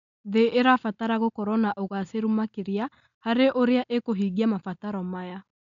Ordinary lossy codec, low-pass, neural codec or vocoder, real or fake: none; 7.2 kHz; none; real